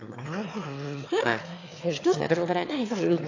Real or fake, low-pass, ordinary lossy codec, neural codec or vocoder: fake; 7.2 kHz; AAC, 48 kbps; autoencoder, 22.05 kHz, a latent of 192 numbers a frame, VITS, trained on one speaker